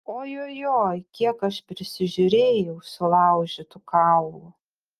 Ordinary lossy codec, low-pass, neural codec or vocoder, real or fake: Opus, 32 kbps; 14.4 kHz; none; real